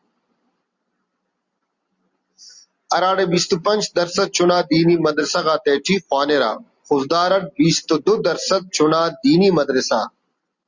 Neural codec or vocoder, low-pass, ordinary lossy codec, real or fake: none; 7.2 kHz; Opus, 64 kbps; real